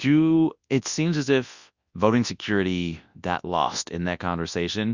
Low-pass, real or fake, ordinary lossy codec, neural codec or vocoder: 7.2 kHz; fake; Opus, 64 kbps; codec, 24 kHz, 0.9 kbps, WavTokenizer, large speech release